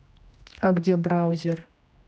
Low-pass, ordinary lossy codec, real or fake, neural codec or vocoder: none; none; fake; codec, 16 kHz, 1 kbps, X-Codec, HuBERT features, trained on general audio